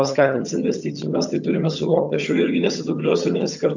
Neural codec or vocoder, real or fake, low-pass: vocoder, 22.05 kHz, 80 mel bands, HiFi-GAN; fake; 7.2 kHz